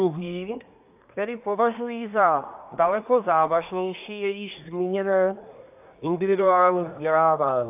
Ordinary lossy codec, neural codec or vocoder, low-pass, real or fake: AAC, 32 kbps; codec, 24 kHz, 1 kbps, SNAC; 3.6 kHz; fake